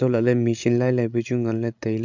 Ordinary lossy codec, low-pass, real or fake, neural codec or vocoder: MP3, 64 kbps; 7.2 kHz; fake; codec, 16 kHz, 16 kbps, FreqCodec, larger model